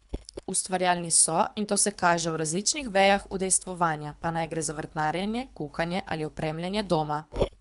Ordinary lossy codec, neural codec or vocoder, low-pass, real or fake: none; codec, 24 kHz, 3 kbps, HILCodec; 10.8 kHz; fake